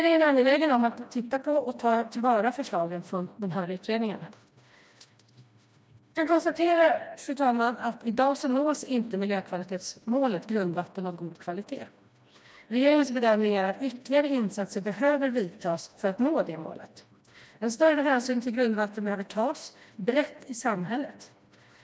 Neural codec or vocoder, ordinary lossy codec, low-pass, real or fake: codec, 16 kHz, 1 kbps, FreqCodec, smaller model; none; none; fake